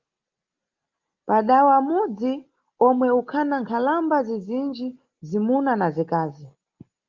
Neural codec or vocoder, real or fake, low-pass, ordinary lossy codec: none; real; 7.2 kHz; Opus, 32 kbps